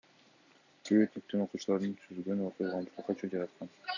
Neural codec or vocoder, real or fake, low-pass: none; real; 7.2 kHz